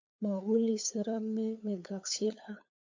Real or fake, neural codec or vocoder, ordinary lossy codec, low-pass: fake; codec, 16 kHz, 8 kbps, FunCodec, trained on LibriTTS, 25 frames a second; MP3, 64 kbps; 7.2 kHz